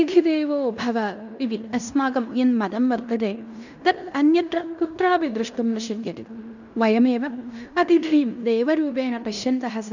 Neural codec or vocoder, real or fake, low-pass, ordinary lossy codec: codec, 16 kHz in and 24 kHz out, 0.9 kbps, LongCat-Audio-Codec, fine tuned four codebook decoder; fake; 7.2 kHz; none